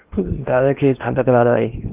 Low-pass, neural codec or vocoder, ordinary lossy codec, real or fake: 3.6 kHz; codec, 16 kHz in and 24 kHz out, 0.8 kbps, FocalCodec, streaming, 65536 codes; Opus, 24 kbps; fake